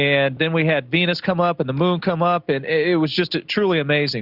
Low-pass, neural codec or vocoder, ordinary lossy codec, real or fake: 5.4 kHz; none; Opus, 64 kbps; real